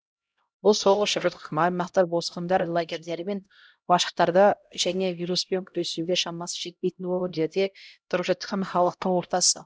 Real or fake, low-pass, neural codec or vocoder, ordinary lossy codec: fake; none; codec, 16 kHz, 0.5 kbps, X-Codec, HuBERT features, trained on LibriSpeech; none